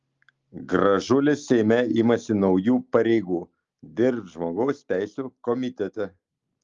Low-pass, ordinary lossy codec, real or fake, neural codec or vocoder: 7.2 kHz; Opus, 32 kbps; real; none